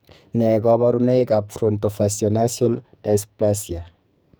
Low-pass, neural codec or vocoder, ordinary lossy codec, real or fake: none; codec, 44.1 kHz, 2.6 kbps, SNAC; none; fake